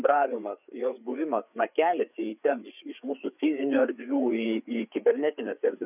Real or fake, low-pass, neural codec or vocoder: fake; 3.6 kHz; codec, 16 kHz, 4 kbps, FreqCodec, larger model